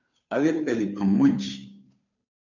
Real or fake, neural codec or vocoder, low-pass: fake; codec, 16 kHz, 2 kbps, FunCodec, trained on Chinese and English, 25 frames a second; 7.2 kHz